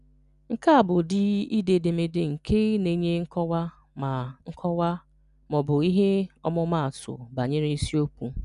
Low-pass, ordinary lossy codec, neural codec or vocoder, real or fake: 10.8 kHz; none; none; real